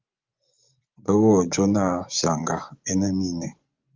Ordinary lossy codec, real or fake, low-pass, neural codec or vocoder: Opus, 24 kbps; fake; 7.2 kHz; vocoder, 24 kHz, 100 mel bands, Vocos